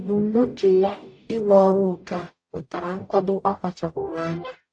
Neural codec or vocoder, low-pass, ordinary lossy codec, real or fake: codec, 44.1 kHz, 0.9 kbps, DAC; 9.9 kHz; AAC, 48 kbps; fake